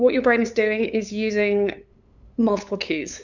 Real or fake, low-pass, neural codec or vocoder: fake; 7.2 kHz; codec, 16 kHz, 8 kbps, FunCodec, trained on LibriTTS, 25 frames a second